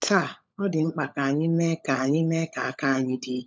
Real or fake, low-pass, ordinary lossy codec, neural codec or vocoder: fake; none; none; codec, 16 kHz, 16 kbps, FunCodec, trained on LibriTTS, 50 frames a second